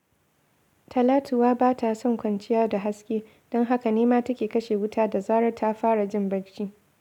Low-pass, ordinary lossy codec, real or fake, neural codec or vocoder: 19.8 kHz; none; real; none